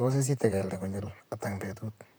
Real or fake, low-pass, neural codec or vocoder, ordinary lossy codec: fake; none; vocoder, 44.1 kHz, 128 mel bands, Pupu-Vocoder; none